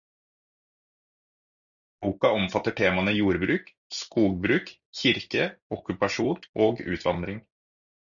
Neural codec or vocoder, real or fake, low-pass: none; real; 7.2 kHz